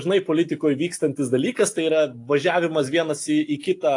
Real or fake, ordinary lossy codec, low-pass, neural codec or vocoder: real; AAC, 48 kbps; 10.8 kHz; none